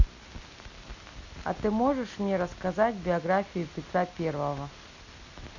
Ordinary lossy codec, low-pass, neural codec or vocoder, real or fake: none; 7.2 kHz; none; real